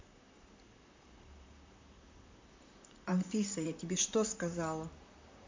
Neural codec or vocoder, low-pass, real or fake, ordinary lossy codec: vocoder, 44.1 kHz, 128 mel bands, Pupu-Vocoder; 7.2 kHz; fake; MP3, 64 kbps